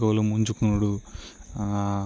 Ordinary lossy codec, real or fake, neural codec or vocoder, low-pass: none; real; none; none